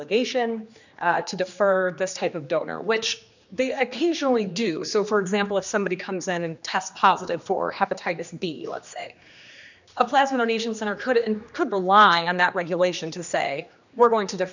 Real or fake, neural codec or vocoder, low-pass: fake; codec, 16 kHz, 2 kbps, X-Codec, HuBERT features, trained on general audio; 7.2 kHz